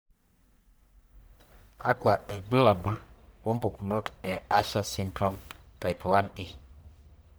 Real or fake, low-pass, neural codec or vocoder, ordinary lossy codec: fake; none; codec, 44.1 kHz, 1.7 kbps, Pupu-Codec; none